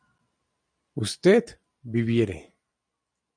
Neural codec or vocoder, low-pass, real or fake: none; 9.9 kHz; real